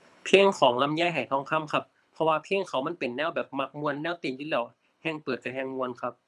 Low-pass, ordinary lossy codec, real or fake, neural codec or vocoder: none; none; fake; codec, 24 kHz, 6 kbps, HILCodec